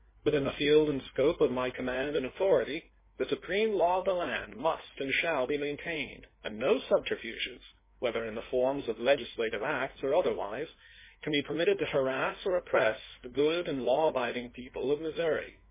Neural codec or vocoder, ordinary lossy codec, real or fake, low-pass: codec, 16 kHz in and 24 kHz out, 1.1 kbps, FireRedTTS-2 codec; MP3, 16 kbps; fake; 3.6 kHz